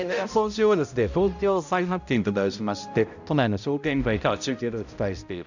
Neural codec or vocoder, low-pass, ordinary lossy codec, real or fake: codec, 16 kHz, 0.5 kbps, X-Codec, HuBERT features, trained on balanced general audio; 7.2 kHz; none; fake